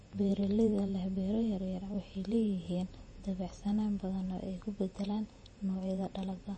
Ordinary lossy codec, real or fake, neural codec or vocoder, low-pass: MP3, 32 kbps; fake; vocoder, 48 kHz, 128 mel bands, Vocos; 10.8 kHz